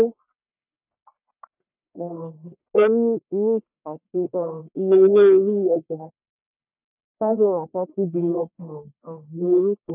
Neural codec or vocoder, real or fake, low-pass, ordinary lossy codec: codec, 44.1 kHz, 1.7 kbps, Pupu-Codec; fake; 3.6 kHz; none